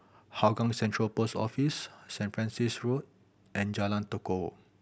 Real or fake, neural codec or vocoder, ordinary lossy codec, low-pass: real; none; none; none